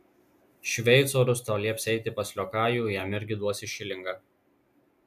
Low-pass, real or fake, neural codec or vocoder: 14.4 kHz; real; none